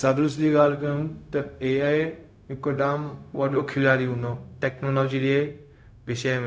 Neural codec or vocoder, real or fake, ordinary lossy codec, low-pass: codec, 16 kHz, 0.4 kbps, LongCat-Audio-Codec; fake; none; none